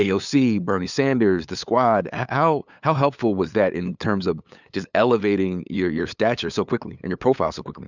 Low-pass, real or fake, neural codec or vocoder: 7.2 kHz; fake; codec, 16 kHz, 8 kbps, FunCodec, trained on LibriTTS, 25 frames a second